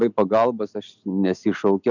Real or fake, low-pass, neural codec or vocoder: real; 7.2 kHz; none